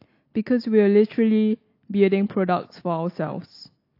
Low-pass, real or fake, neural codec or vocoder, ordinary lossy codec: 5.4 kHz; real; none; AAC, 32 kbps